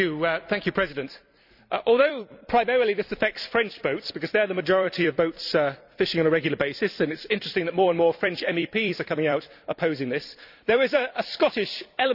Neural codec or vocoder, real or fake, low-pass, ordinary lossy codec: vocoder, 44.1 kHz, 128 mel bands every 256 samples, BigVGAN v2; fake; 5.4 kHz; none